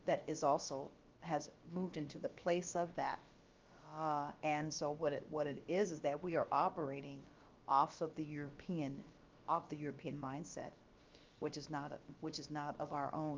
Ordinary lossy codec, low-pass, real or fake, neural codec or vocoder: Opus, 32 kbps; 7.2 kHz; fake; codec, 16 kHz, about 1 kbps, DyCAST, with the encoder's durations